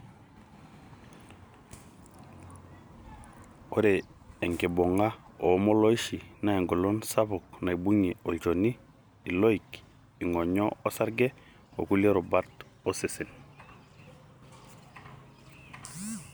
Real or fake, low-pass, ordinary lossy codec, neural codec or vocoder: real; none; none; none